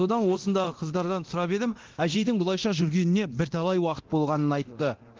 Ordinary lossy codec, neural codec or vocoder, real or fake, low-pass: Opus, 16 kbps; codec, 24 kHz, 0.9 kbps, DualCodec; fake; 7.2 kHz